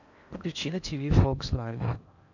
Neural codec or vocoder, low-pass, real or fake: codec, 16 kHz in and 24 kHz out, 0.8 kbps, FocalCodec, streaming, 65536 codes; 7.2 kHz; fake